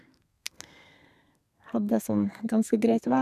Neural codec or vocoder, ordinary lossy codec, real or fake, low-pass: codec, 32 kHz, 1.9 kbps, SNAC; none; fake; 14.4 kHz